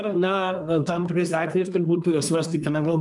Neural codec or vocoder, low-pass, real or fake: codec, 24 kHz, 1 kbps, SNAC; 10.8 kHz; fake